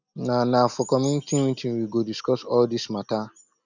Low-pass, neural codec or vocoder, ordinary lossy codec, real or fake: 7.2 kHz; none; none; real